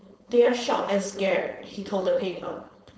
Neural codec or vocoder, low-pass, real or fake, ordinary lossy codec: codec, 16 kHz, 4.8 kbps, FACodec; none; fake; none